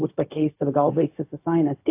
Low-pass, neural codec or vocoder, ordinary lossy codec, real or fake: 3.6 kHz; codec, 16 kHz, 0.4 kbps, LongCat-Audio-Codec; AAC, 24 kbps; fake